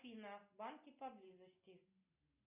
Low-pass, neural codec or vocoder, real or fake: 3.6 kHz; none; real